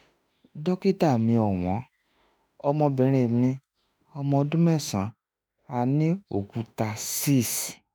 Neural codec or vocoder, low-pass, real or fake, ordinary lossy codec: autoencoder, 48 kHz, 32 numbers a frame, DAC-VAE, trained on Japanese speech; none; fake; none